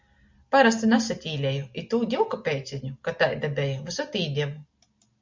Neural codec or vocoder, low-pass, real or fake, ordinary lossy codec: none; 7.2 kHz; real; MP3, 64 kbps